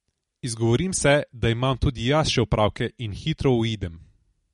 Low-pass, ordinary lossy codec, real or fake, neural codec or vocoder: 14.4 kHz; MP3, 48 kbps; real; none